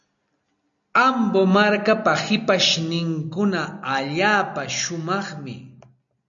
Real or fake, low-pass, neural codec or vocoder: real; 7.2 kHz; none